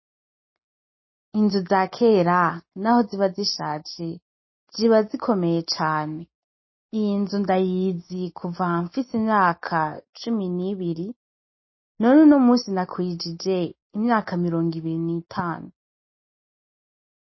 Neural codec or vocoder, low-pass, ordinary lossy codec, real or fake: none; 7.2 kHz; MP3, 24 kbps; real